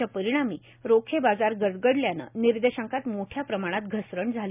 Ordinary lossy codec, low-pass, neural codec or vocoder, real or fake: none; 3.6 kHz; none; real